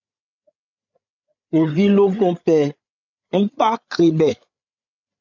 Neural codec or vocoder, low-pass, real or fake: codec, 16 kHz, 8 kbps, FreqCodec, larger model; 7.2 kHz; fake